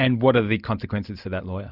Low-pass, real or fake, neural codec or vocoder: 5.4 kHz; real; none